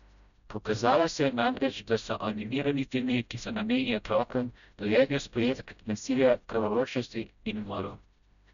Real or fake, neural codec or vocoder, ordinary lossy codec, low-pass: fake; codec, 16 kHz, 0.5 kbps, FreqCodec, smaller model; none; 7.2 kHz